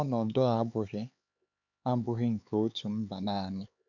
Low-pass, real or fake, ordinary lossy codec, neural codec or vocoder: 7.2 kHz; fake; none; codec, 16 kHz, 4 kbps, X-Codec, HuBERT features, trained on LibriSpeech